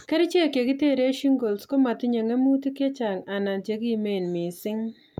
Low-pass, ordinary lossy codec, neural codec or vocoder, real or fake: 19.8 kHz; none; none; real